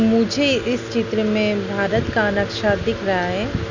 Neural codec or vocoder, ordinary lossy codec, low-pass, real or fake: none; none; 7.2 kHz; real